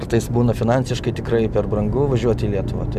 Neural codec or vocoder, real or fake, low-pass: none; real; 14.4 kHz